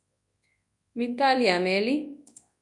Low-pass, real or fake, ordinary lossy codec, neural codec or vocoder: 10.8 kHz; fake; MP3, 64 kbps; codec, 24 kHz, 0.9 kbps, WavTokenizer, large speech release